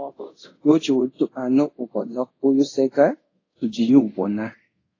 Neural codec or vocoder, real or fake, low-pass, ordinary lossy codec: codec, 24 kHz, 0.5 kbps, DualCodec; fake; 7.2 kHz; AAC, 32 kbps